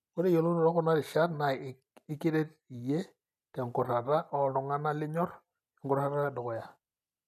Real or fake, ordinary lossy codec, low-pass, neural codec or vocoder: real; none; 14.4 kHz; none